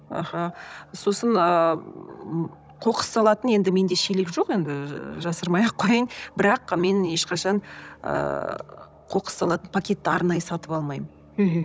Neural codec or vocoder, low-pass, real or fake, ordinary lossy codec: codec, 16 kHz, 16 kbps, FunCodec, trained on Chinese and English, 50 frames a second; none; fake; none